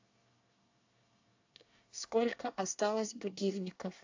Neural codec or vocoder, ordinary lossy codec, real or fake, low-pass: codec, 24 kHz, 1 kbps, SNAC; AAC, 48 kbps; fake; 7.2 kHz